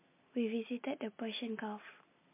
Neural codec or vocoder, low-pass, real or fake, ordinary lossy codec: none; 3.6 kHz; real; MP3, 24 kbps